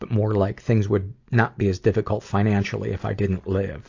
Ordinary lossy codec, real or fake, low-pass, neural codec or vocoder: AAC, 48 kbps; real; 7.2 kHz; none